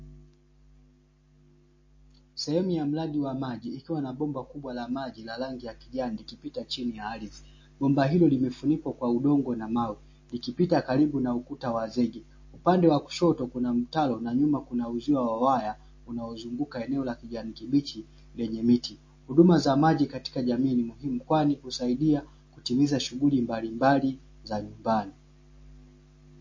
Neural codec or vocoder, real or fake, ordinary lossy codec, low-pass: none; real; MP3, 32 kbps; 7.2 kHz